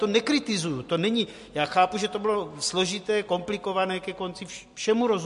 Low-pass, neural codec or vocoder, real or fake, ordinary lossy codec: 14.4 kHz; none; real; MP3, 48 kbps